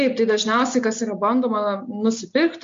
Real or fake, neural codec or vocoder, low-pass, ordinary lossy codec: real; none; 7.2 kHz; MP3, 48 kbps